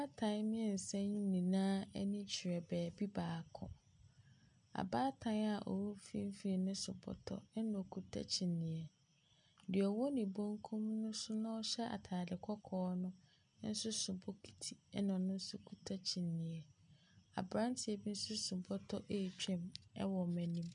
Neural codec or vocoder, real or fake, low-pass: none; real; 9.9 kHz